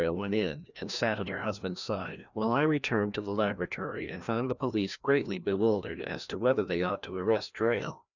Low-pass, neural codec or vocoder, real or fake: 7.2 kHz; codec, 16 kHz, 1 kbps, FreqCodec, larger model; fake